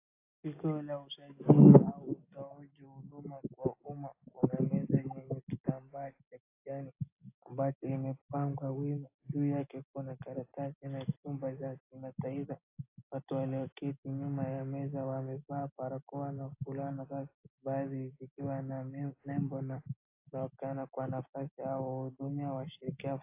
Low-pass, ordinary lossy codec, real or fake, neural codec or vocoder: 3.6 kHz; AAC, 24 kbps; real; none